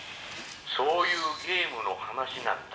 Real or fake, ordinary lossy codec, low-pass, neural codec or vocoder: real; none; none; none